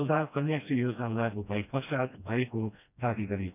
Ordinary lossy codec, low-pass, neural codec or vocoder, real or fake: none; 3.6 kHz; codec, 16 kHz, 1 kbps, FreqCodec, smaller model; fake